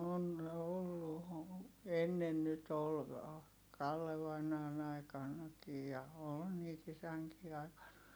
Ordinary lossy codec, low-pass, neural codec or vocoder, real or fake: none; none; none; real